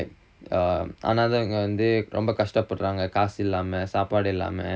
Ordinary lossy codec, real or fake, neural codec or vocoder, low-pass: none; real; none; none